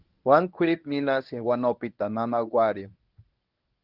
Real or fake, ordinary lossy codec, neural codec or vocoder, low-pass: fake; Opus, 32 kbps; codec, 24 kHz, 0.9 kbps, WavTokenizer, medium speech release version 1; 5.4 kHz